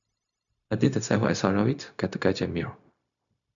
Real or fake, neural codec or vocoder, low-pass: fake; codec, 16 kHz, 0.4 kbps, LongCat-Audio-Codec; 7.2 kHz